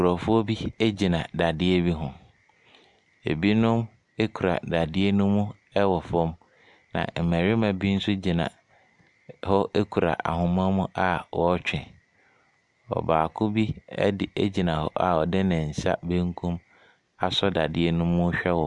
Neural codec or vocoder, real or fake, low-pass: none; real; 10.8 kHz